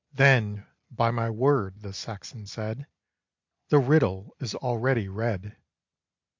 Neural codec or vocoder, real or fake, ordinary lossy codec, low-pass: none; real; MP3, 64 kbps; 7.2 kHz